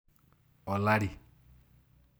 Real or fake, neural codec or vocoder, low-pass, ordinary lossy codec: real; none; none; none